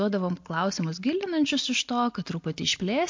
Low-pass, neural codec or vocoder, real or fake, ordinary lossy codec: 7.2 kHz; none; real; MP3, 48 kbps